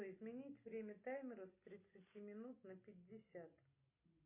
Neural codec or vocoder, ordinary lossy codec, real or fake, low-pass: none; MP3, 24 kbps; real; 3.6 kHz